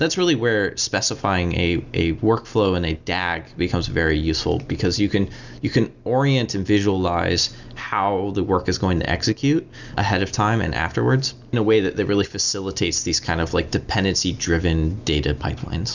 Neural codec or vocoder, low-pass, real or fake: none; 7.2 kHz; real